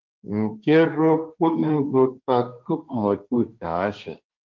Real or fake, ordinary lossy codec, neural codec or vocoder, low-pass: fake; Opus, 32 kbps; codec, 16 kHz, 1.1 kbps, Voila-Tokenizer; 7.2 kHz